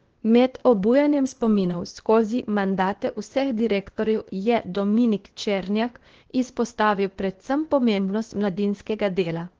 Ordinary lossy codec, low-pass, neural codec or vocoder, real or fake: Opus, 16 kbps; 7.2 kHz; codec, 16 kHz, 0.8 kbps, ZipCodec; fake